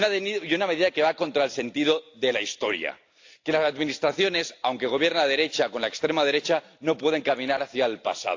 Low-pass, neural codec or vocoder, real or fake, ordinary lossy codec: 7.2 kHz; none; real; AAC, 48 kbps